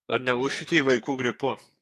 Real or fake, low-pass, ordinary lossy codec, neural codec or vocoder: fake; 14.4 kHz; AAC, 64 kbps; codec, 44.1 kHz, 2.6 kbps, SNAC